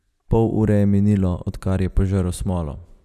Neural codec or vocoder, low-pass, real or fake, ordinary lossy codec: vocoder, 44.1 kHz, 128 mel bands every 256 samples, BigVGAN v2; 14.4 kHz; fake; none